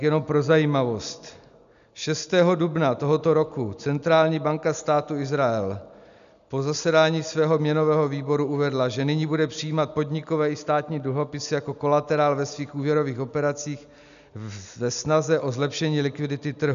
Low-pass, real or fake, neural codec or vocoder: 7.2 kHz; real; none